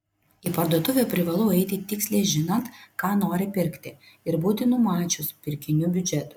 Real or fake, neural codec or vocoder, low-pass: real; none; 19.8 kHz